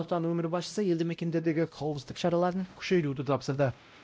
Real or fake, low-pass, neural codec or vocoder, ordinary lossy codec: fake; none; codec, 16 kHz, 0.5 kbps, X-Codec, WavLM features, trained on Multilingual LibriSpeech; none